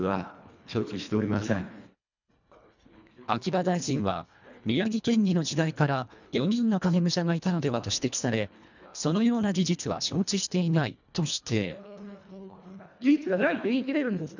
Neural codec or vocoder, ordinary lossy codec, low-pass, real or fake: codec, 24 kHz, 1.5 kbps, HILCodec; none; 7.2 kHz; fake